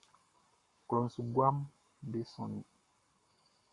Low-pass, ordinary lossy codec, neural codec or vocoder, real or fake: 10.8 kHz; MP3, 48 kbps; codec, 44.1 kHz, 7.8 kbps, Pupu-Codec; fake